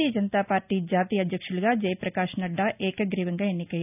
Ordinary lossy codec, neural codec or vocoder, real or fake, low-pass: none; none; real; 3.6 kHz